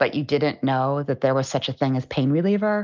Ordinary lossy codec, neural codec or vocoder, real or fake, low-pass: Opus, 24 kbps; none; real; 7.2 kHz